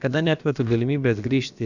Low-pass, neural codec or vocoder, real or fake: 7.2 kHz; codec, 16 kHz, about 1 kbps, DyCAST, with the encoder's durations; fake